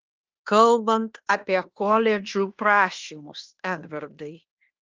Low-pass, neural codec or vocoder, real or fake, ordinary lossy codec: 7.2 kHz; codec, 16 kHz in and 24 kHz out, 0.9 kbps, LongCat-Audio-Codec, fine tuned four codebook decoder; fake; Opus, 32 kbps